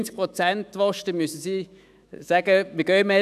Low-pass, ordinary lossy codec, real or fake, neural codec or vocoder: 14.4 kHz; none; fake; autoencoder, 48 kHz, 128 numbers a frame, DAC-VAE, trained on Japanese speech